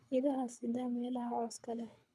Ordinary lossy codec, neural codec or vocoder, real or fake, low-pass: none; codec, 24 kHz, 6 kbps, HILCodec; fake; none